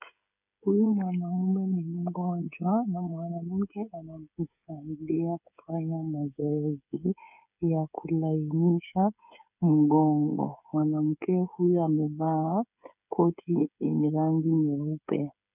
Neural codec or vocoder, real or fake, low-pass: codec, 16 kHz, 8 kbps, FreqCodec, smaller model; fake; 3.6 kHz